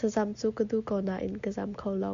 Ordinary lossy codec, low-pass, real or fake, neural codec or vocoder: MP3, 64 kbps; 7.2 kHz; real; none